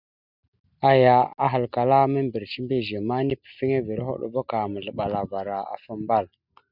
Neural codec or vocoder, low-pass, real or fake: none; 5.4 kHz; real